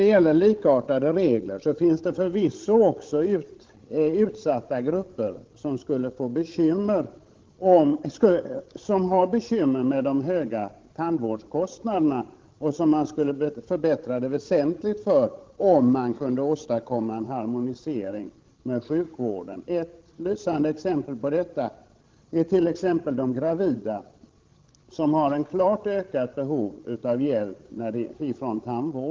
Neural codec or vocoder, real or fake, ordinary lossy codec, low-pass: codec, 16 kHz, 16 kbps, FreqCodec, larger model; fake; Opus, 16 kbps; 7.2 kHz